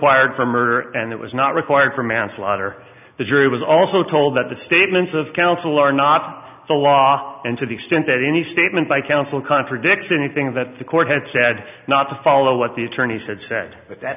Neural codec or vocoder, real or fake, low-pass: none; real; 3.6 kHz